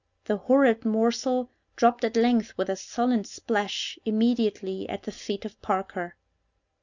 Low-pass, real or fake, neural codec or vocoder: 7.2 kHz; real; none